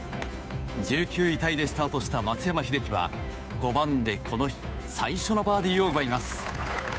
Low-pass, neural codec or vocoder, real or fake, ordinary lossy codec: none; codec, 16 kHz, 2 kbps, FunCodec, trained on Chinese and English, 25 frames a second; fake; none